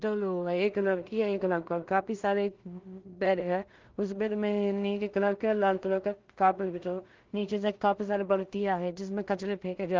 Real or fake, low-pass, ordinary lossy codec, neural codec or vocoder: fake; 7.2 kHz; Opus, 32 kbps; codec, 16 kHz in and 24 kHz out, 0.4 kbps, LongCat-Audio-Codec, two codebook decoder